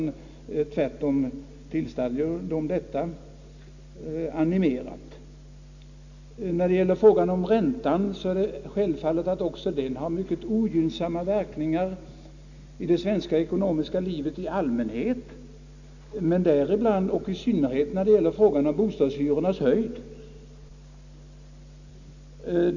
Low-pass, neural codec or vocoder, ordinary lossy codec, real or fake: 7.2 kHz; none; none; real